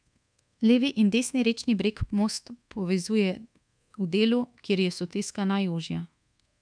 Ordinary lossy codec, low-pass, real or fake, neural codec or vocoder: none; 9.9 kHz; fake; codec, 24 kHz, 1.2 kbps, DualCodec